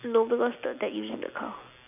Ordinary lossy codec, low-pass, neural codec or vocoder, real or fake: none; 3.6 kHz; none; real